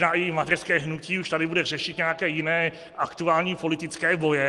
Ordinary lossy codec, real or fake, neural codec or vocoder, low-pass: Opus, 24 kbps; real; none; 10.8 kHz